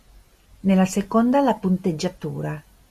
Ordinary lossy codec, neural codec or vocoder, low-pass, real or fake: MP3, 96 kbps; none; 14.4 kHz; real